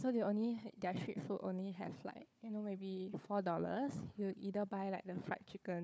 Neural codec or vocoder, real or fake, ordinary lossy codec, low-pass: codec, 16 kHz, 4 kbps, FunCodec, trained on Chinese and English, 50 frames a second; fake; none; none